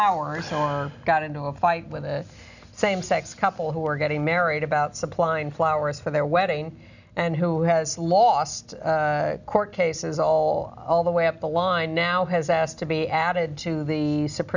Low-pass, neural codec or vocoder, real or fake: 7.2 kHz; none; real